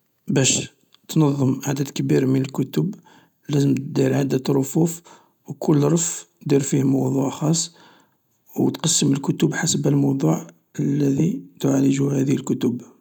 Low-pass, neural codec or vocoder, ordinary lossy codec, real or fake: 19.8 kHz; none; none; real